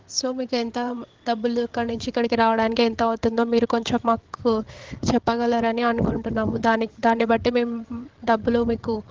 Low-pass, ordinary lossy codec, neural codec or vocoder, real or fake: 7.2 kHz; Opus, 16 kbps; codec, 16 kHz, 8 kbps, FreqCodec, larger model; fake